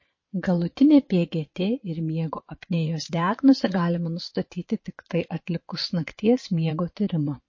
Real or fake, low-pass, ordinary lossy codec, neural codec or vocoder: fake; 7.2 kHz; MP3, 32 kbps; vocoder, 22.05 kHz, 80 mel bands, Vocos